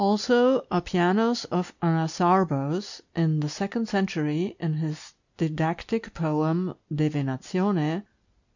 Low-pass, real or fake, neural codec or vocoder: 7.2 kHz; real; none